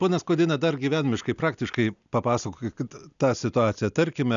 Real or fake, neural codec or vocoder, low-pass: real; none; 7.2 kHz